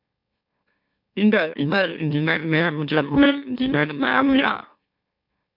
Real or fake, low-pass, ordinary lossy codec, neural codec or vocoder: fake; 5.4 kHz; MP3, 48 kbps; autoencoder, 44.1 kHz, a latent of 192 numbers a frame, MeloTTS